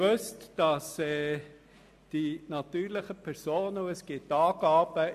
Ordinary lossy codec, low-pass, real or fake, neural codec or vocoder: MP3, 64 kbps; 14.4 kHz; real; none